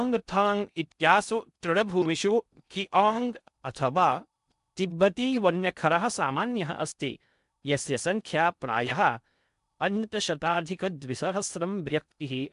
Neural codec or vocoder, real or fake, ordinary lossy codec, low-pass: codec, 16 kHz in and 24 kHz out, 0.6 kbps, FocalCodec, streaming, 2048 codes; fake; none; 10.8 kHz